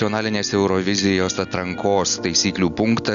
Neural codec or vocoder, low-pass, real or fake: none; 7.2 kHz; real